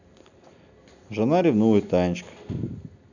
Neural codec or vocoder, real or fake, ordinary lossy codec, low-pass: none; real; none; 7.2 kHz